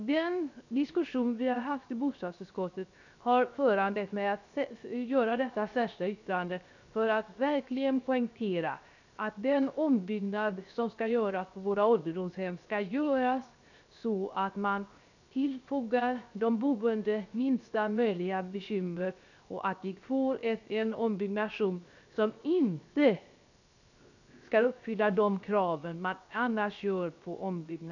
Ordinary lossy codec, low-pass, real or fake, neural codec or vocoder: none; 7.2 kHz; fake; codec, 16 kHz, 0.7 kbps, FocalCodec